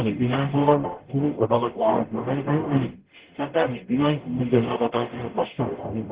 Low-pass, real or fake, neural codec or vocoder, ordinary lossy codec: 3.6 kHz; fake; codec, 44.1 kHz, 0.9 kbps, DAC; Opus, 16 kbps